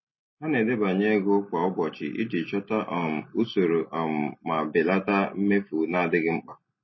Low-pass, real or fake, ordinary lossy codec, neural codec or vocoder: 7.2 kHz; real; MP3, 24 kbps; none